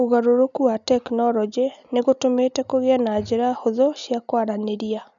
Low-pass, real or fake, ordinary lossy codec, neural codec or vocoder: 7.2 kHz; real; none; none